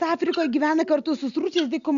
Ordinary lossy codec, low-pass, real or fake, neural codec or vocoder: Opus, 64 kbps; 7.2 kHz; real; none